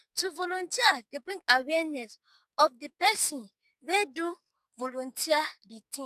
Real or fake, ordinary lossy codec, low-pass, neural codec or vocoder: fake; none; 14.4 kHz; codec, 44.1 kHz, 2.6 kbps, SNAC